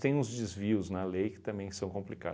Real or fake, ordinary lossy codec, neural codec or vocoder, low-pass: real; none; none; none